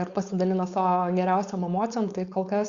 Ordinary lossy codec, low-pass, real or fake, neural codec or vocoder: Opus, 64 kbps; 7.2 kHz; fake; codec, 16 kHz, 4.8 kbps, FACodec